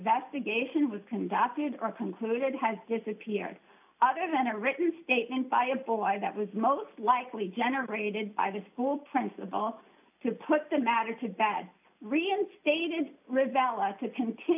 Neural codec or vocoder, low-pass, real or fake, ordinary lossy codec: none; 3.6 kHz; real; AAC, 32 kbps